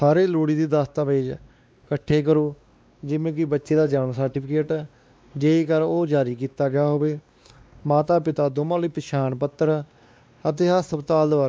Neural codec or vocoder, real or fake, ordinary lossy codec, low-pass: codec, 16 kHz, 2 kbps, X-Codec, WavLM features, trained on Multilingual LibriSpeech; fake; none; none